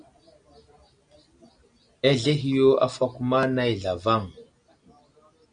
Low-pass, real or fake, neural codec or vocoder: 9.9 kHz; real; none